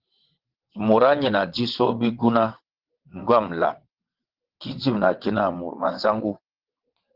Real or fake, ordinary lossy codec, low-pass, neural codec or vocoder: fake; Opus, 16 kbps; 5.4 kHz; vocoder, 22.05 kHz, 80 mel bands, WaveNeXt